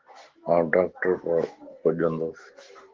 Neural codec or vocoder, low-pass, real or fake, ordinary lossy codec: none; 7.2 kHz; real; Opus, 16 kbps